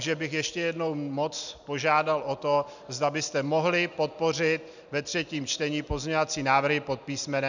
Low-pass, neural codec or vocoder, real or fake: 7.2 kHz; none; real